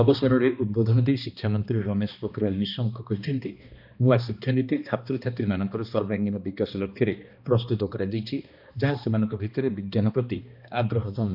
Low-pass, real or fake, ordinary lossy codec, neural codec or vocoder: 5.4 kHz; fake; none; codec, 16 kHz, 2 kbps, X-Codec, HuBERT features, trained on balanced general audio